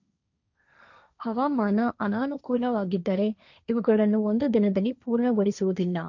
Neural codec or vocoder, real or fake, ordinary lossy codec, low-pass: codec, 16 kHz, 1.1 kbps, Voila-Tokenizer; fake; none; 7.2 kHz